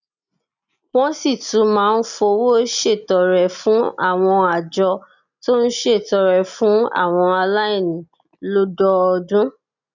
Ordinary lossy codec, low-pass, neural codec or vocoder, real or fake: none; 7.2 kHz; none; real